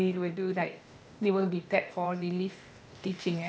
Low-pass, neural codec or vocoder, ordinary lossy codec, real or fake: none; codec, 16 kHz, 0.8 kbps, ZipCodec; none; fake